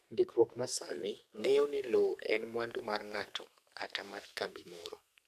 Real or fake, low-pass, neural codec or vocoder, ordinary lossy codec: fake; 14.4 kHz; codec, 44.1 kHz, 2.6 kbps, SNAC; none